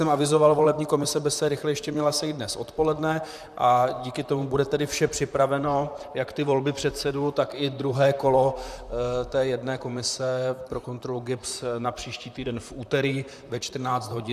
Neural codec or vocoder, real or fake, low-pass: vocoder, 44.1 kHz, 128 mel bands, Pupu-Vocoder; fake; 14.4 kHz